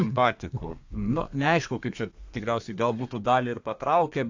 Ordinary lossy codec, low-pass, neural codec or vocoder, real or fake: AAC, 48 kbps; 7.2 kHz; codec, 32 kHz, 1.9 kbps, SNAC; fake